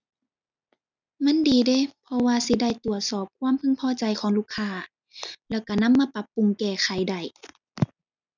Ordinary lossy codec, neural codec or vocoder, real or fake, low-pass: none; none; real; 7.2 kHz